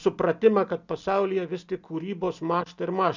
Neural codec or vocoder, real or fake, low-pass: none; real; 7.2 kHz